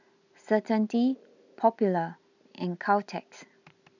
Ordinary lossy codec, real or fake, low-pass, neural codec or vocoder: none; real; 7.2 kHz; none